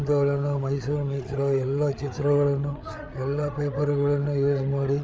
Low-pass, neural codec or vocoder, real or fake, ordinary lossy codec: none; codec, 16 kHz, 8 kbps, FreqCodec, larger model; fake; none